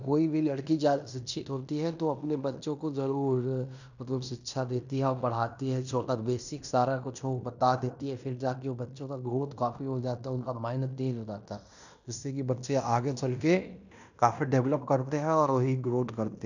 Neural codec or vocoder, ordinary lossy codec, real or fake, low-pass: codec, 16 kHz in and 24 kHz out, 0.9 kbps, LongCat-Audio-Codec, fine tuned four codebook decoder; none; fake; 7.2 kHz